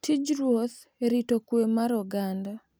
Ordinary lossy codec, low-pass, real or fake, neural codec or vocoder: none; none; real; none